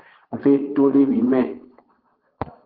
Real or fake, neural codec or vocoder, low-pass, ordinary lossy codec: fake; vocoder, 22.05 kHz, 80 mel bands, Vocos; 5.4 kHz; Opus, 16 kbps